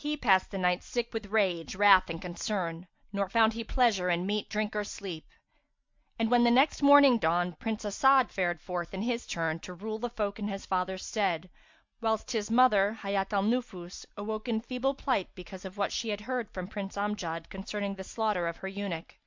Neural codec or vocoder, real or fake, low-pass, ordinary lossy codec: none; real; 7.2 kHz; MP3, 64 kbps